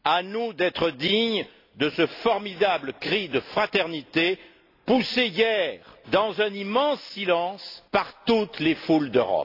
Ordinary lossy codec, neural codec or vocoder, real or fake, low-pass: AAC, 32 kbps; none; real; 5.4 kHz